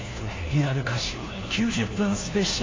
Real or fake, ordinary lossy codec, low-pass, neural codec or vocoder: fake; AAC, 32 kbps; 7.2 kHz; codec, 16 kHz, 1 kbps, FunCodec, trained on LibriTTS, 50 frames a second